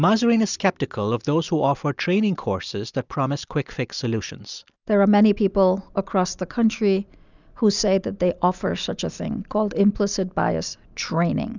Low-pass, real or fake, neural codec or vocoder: 7.2 kHz; real; none